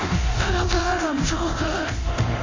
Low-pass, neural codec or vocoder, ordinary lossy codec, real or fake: 7.2 kHz; codec, 24 kHz, 0.9 kbps, DualCodec; MP3, 32 kbps; fake